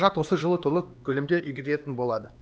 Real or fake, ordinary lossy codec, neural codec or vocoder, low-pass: fake; none; codec, 16 kHz, 2 kbps, X-Codec, HuBERT features, trained on LibriSpeech; none